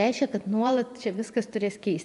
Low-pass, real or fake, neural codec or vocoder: 10.8 kHz; fake; vocoder, 24 kHz, 100 mel bands, Vocos